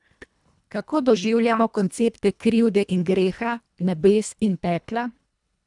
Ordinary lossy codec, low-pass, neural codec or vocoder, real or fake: none; 10.8 kHz; codec, 24 kHz, 1.5 kbps, HILCodec; fake